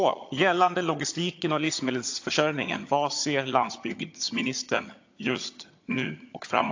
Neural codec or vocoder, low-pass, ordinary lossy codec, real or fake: vocoder, 22.05 kHz, 80 mel bands, HiFi-GAN; 7.2 kHz; AAC, 48 kbps; fake